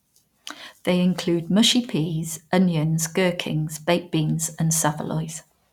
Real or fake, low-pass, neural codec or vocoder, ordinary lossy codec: real; 19.8 kHz; none; none